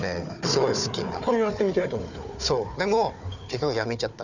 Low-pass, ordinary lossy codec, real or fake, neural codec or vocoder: 7.2 kHz; none; fake; codec, 16 kHz, 4 kbps, FunCodec, trained on Chinese and English, 50 frames a second